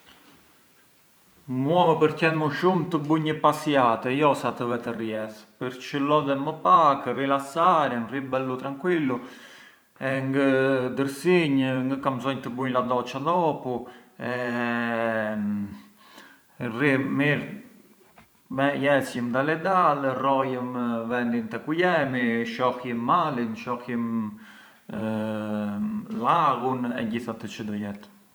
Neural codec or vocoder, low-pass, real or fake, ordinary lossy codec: vocoder, 44.1 kHz, 128 mel bands every 512 samples, BigVGAN v2; none; fake; none